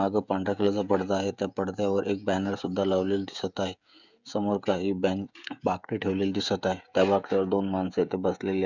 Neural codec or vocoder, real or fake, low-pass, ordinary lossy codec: codec, 16 kHz, 16 kbps, FreqCodec, smaller model; fake; 7.2 kHz; none